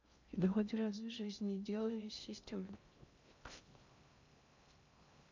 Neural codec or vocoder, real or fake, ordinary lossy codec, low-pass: codec, 16 kHz in and 24 kHz out, 0.6 kbps, FocalCodec, streaming, 2048 codes; fake; MP3, 64 kbps; 7.2 kHz